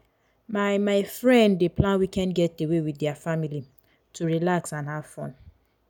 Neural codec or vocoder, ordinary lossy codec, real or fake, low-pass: none; none; real; 19.8 kHz